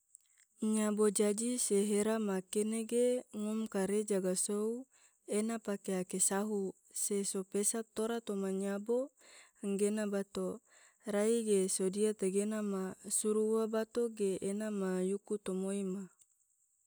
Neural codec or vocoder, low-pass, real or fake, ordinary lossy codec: none; none; real; none